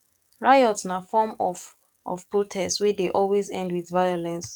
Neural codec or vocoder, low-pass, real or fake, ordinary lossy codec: codec, 44.1 kHz, 7.8 kbps, DAC; 19.8 kHz; fake; none